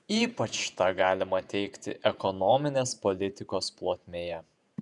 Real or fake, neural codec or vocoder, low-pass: fake; vocoder, 48 kHz, 128 mel bands, Vocos; 10.8 kHz